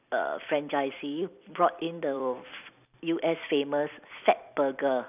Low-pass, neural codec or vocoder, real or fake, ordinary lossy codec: 3.6 kHz; vocoder, 44.1 kHz, 128 mel bands every 256 samples, BigVGAN v2; fake; none